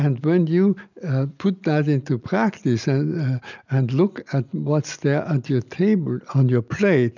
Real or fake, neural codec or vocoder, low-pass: real; none; 7.2 kHz